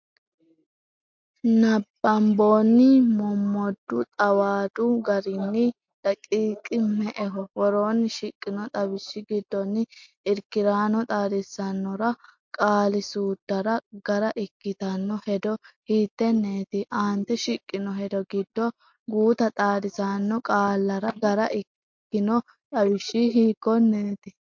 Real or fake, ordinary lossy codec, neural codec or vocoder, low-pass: real; MP3, 48 kbps; none; 7.2 kHz